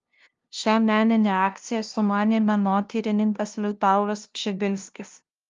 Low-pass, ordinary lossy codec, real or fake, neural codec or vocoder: 7.2 kHz; Opus, 32 kbps; fake; codec, 16 kHz, 0.5 kbps, FunCodec, trained on LibriTTS, 25 frames a second